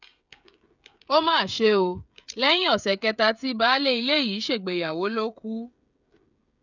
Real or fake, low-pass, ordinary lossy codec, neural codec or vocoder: fake; 7.2 kHz; none; codec, 16 kHz, 16 kbps, FreqCodec, smaller model